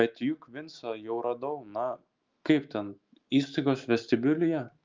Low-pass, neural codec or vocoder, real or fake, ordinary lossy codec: 7.2 kHz; autoencoder, 48 kHz, 128 numbers a frame, DAC-VAE, trained on Japanese speech; fake; Opus, 32 kbps